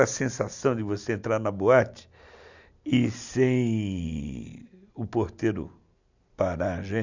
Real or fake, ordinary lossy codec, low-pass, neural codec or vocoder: real; none; 7.2 kHz; none